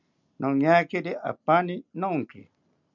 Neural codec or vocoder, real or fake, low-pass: none; real; 7.2 kHz